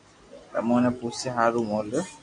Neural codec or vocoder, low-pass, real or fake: none; 9.9 kHz; real